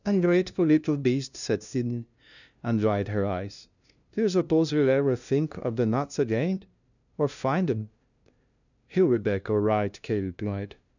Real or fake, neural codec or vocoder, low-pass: fake; codec, 16 kHz, 0.5 kbps, FunCodec, trained on LibriTTS, 25 frames a second; 7.2 kHz